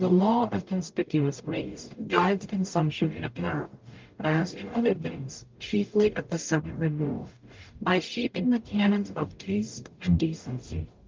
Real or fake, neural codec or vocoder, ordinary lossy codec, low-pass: fake; codec, 44.1 kHz, 0.9 kbps, DAC; Opus, 24 kbps; 7.2 kHz